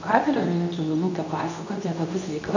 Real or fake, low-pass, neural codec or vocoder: fake; 7.2 kHz; codec, 24 kHz, 0.9 kbps, WavTokenizer, medium speech release version 2